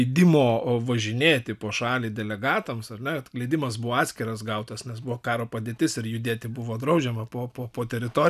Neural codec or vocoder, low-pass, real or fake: none; 14.4 kHz; real